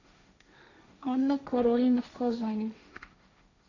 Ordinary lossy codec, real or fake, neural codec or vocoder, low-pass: none; fake; codec, 16 kHz, 1.1 kbps, Voila-Tokenizer; 7.2 kHz